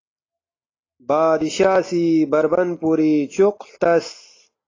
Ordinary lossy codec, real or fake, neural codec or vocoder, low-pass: AAC, 32 kbps; real; none; 7.2 kHz